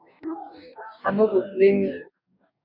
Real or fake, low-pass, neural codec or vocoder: fake; 5.4 kHz; codec, 44.1 kHz, 2.6 kbps, DAC